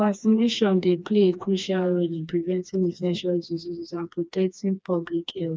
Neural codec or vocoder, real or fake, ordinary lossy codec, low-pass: codec, 16 kHz, 2 kbps, FreqCodec, smaller model; fake; none; none